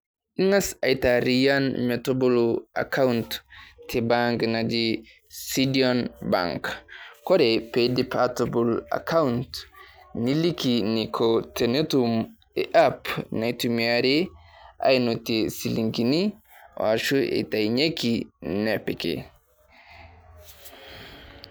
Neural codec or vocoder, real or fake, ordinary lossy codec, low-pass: none; real; none; none